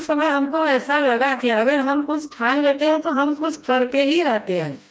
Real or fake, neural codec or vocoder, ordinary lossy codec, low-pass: fake; codec, 16 kHz, 1 kbps, FreqCodec, smaller model; none; none